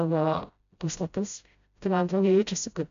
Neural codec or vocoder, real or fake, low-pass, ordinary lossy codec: codec, 16 kHz, 0.5 kbps, FreqCodec, smaller model; fake; 7.2 kHz; MP3, 64 kbps